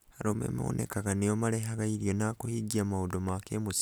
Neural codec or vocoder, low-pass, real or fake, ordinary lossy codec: none; none; real; none